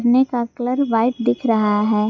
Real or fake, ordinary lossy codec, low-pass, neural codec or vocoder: real; none; 7.2 kHz; none